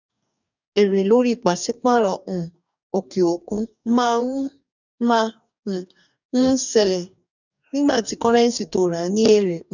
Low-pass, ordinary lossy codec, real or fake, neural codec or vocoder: 7.2 kHz; none; fake; codec, 44.1 kHz, 2.6 kbps, DAC